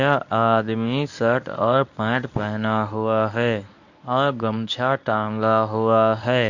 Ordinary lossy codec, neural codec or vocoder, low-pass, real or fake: none; codec, 24 kHz, 0.9 kbps, WavTokenizer, medium speech release version 1; 7.2 kHz; fake